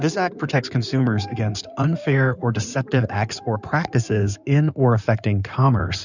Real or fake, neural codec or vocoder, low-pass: fake; codec, 16 kHz in and 24 kHz out, 2.2 kbps, FireRedTTS-2 codec; 7.2 kHz